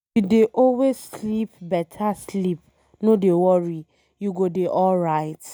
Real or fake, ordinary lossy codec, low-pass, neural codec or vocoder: real; none; none; none